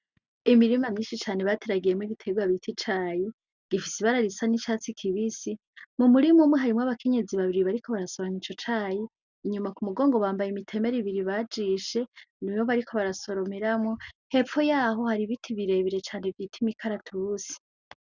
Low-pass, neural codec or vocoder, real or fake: 7.2 kHz; none; real